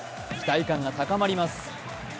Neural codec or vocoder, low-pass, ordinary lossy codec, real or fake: none; none; none; real